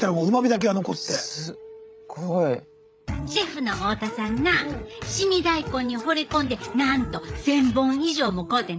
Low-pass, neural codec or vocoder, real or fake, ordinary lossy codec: none; codec, 16 kHz, 8 kbps, FreqCodec, larger model; fake; none